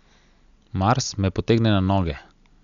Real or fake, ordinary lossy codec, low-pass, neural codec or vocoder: real; none; 7.2 kHz; none